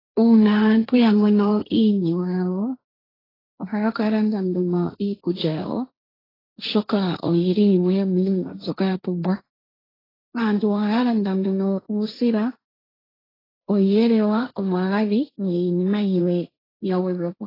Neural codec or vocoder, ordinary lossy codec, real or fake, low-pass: codec, 16 kHz, 1.1 kbps, Voila-Tokenizer; AAC, 24 kbps; fake; 5.4 kHz